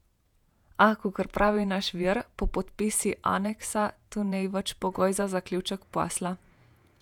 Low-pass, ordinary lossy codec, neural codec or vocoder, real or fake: 19.8 kHz; none; vocoder, 48 kHz, 128 mel bands, Vocos; fake